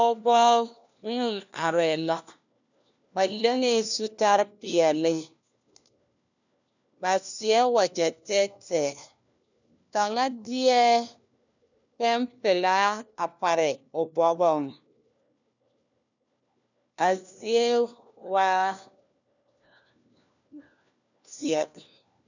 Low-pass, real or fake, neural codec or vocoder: 7.2 kHz; fake; codec, 16 kHz, 1 kbps, FunCodec, trained on LibriTTS, 50 frames a second